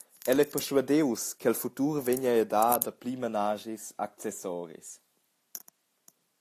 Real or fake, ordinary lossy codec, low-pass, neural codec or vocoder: real; AAC, 64 kbps; 14.4 kHz; none